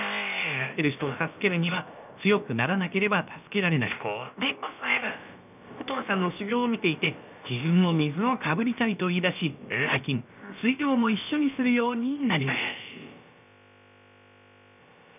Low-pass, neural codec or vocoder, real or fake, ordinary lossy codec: 3.6 kHz; codec, 16 kHz, about 1 kbps, DyCAST, with the encoder's durations; fake; none